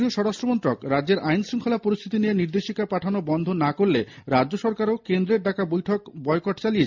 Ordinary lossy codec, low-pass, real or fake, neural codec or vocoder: MP3, 64 kbps; 7.2 kHz; real; none